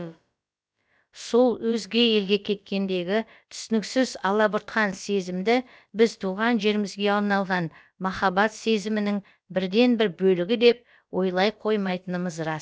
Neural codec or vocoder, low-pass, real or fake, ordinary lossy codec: codec, 16 kHz, about 1 kbps, DyCAST, with the encoder's durations; none; fake; none